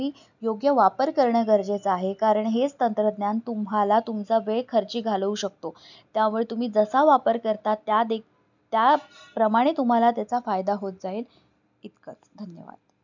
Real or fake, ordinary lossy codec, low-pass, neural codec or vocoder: real; none; 7.2 kHz; none